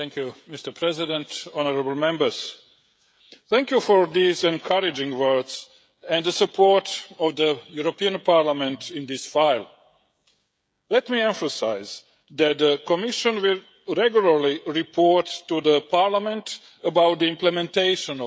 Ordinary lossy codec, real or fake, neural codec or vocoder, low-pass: none; fake; codec, 16 kHz, 16 kbps, FreqCodec, smaller model; none